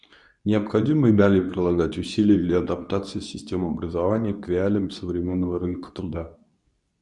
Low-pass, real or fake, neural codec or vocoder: 10.8 kHz; fake; codec, 24 kHz, 0.9 kbps, WavTokenizer, medium speech release version 2